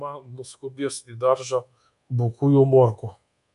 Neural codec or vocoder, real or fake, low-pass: codec, 24 kHz, 1.2 kbps, DualCodec; fake; 10.8 kHz